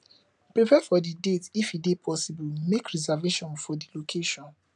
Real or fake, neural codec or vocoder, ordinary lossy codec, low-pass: real; none; none; 10.8 kHz